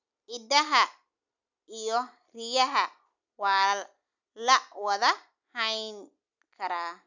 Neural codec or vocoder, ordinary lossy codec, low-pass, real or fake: none; none; 7.2 kHz; real